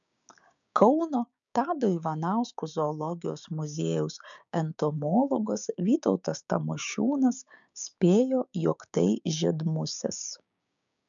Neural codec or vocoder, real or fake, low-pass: codec, 16 kHz, 6 kbps, DAC; fake; 7.2 kHz